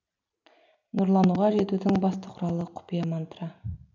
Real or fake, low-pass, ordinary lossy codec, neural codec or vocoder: real; 7.2 kHz; MP3, 64 kbps; none